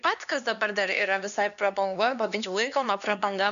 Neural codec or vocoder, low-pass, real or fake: codec, 16 kHz, 2 kbps, X-Codec, HuBERT features, trained on LibriSpeech; 7.2 kHz; fake